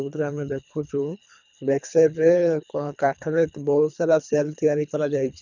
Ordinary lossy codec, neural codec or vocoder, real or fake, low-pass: none; codec, 24 kHz, 3 kbps, HILCodec; fake; 7.2 kHz